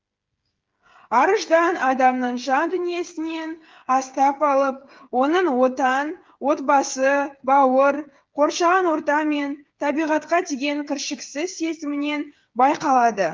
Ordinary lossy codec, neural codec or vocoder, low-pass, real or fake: Opus, 32 kbps; codec, 16 kHz, 8 kbps, FreqCodec, smaller model; 7.2 kHz; fake